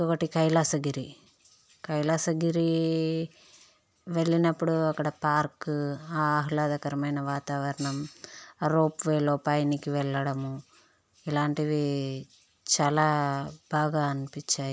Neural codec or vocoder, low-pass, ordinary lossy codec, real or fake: none; none; none; real